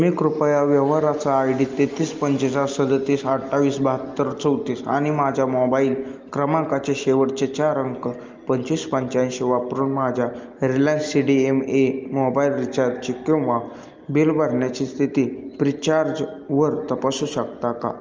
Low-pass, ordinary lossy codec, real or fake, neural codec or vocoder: 7.2 kHz; Opus, 24 kbps; real; none